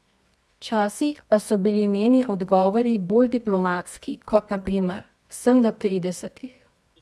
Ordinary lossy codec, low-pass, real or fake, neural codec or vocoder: none; none; fake; codec, 24 kHz, 0.9 kbps, WavTokenizer, medium music audio release